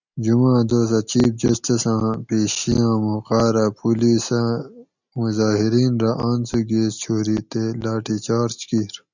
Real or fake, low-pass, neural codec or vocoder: real; 7.2 kHz; none